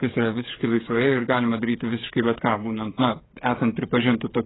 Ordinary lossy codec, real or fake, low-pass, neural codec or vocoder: AAC, 16 kbps; fake; 7.2 kHz; codec, 16 kHz, 8 kbps, FreqCodec, smaller model